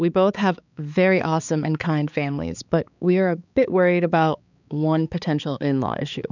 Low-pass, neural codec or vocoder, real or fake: 7.2 kHz; codec, 16 kHz, 4 kbps, X-Codec, HuBERT features, trained on balanced general audio; fake